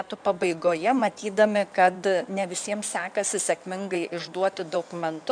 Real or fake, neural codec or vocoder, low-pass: fake; codec, 16 kHz in and 24 kHz out, 2.2 kbps, FireRedTTS-2 codec; 9.9 kHz